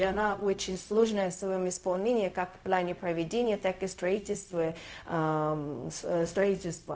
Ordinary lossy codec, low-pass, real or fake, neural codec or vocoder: none; none; fake; codec, 16 kHz, 0.4 kbps, LongCat-Audio-Codec